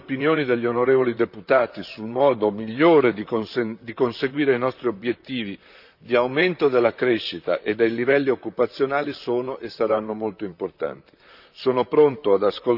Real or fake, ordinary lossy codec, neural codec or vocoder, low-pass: fake; none; vocoder, 44.1 kHz, 128 mel bands, Pupu-Vocoder; 5.4 kHz